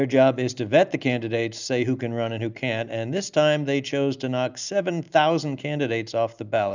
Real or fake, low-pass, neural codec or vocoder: real; 7.2 kHz; none